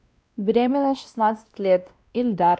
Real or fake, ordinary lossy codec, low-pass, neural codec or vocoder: fake; none; none; codec, 16 kHz, 1 kbps, X-Codec, WavLM features, trained on Multilingual LibriSpeech